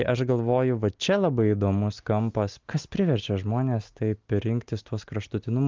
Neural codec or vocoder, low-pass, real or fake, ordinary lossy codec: none; 7.2 kHz; real; Opus, 32 kbps